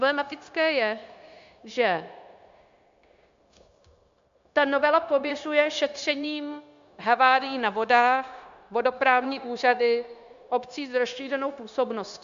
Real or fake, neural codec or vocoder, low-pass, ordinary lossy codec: fake; codec, 16 kHz, 0.9 kbps, LongCat-Audio-Codec; 7.2 kHz; MP3, 64 kbps